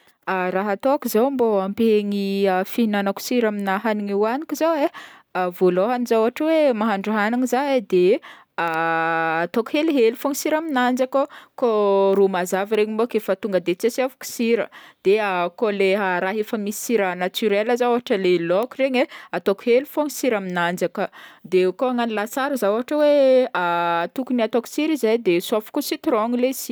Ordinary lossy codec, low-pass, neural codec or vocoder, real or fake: none; none; none; real